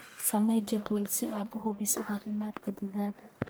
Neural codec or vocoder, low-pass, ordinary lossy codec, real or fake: codec, 44.1 kHz, 1.7 kbps, Pupu-Codec; none; none; fake